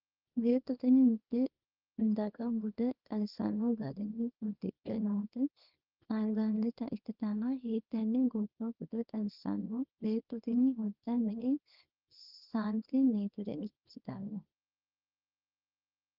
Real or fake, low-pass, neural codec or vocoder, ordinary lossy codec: fake; 5.4 kHz; codec, 24 kHz, 0.9 kbps, WavTokenizer, small release; Opus, 24 kbps